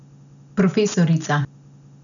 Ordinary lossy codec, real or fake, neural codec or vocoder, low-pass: none; real; none; 7.2 kHz